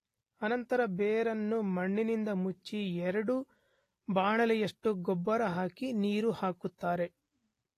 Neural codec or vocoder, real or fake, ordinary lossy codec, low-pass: none; real; AAC, 48 kbps; 14.4 kHz